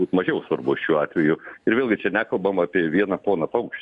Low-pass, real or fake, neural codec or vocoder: 10.8 kHz; real; none